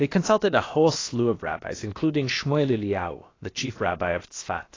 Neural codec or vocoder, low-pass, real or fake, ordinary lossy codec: codec, 16 kHz, about 1 kbps, DyCAST, with the encoder's durations; 7.2 kHz; fake; AAC, 32 kbps